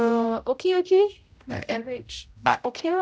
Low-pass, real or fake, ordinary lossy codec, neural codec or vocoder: none; fake; none; codec, 16 kHz, 0.5 kbps, X-Codec, HuBERT features, trained on general audio